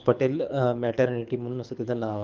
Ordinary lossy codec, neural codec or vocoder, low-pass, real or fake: Opus, 32 kbps; codec, 24 kHz, 6 kbps, HILCodec; 7.2 kHz; fake